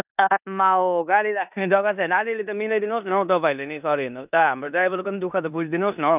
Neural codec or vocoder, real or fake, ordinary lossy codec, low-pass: codec, 16 kHz in and 24 kHz out, 0.9 kbps, LongCat-Audio-Codec, four codebook decoder; fake; none; 3.6 kHz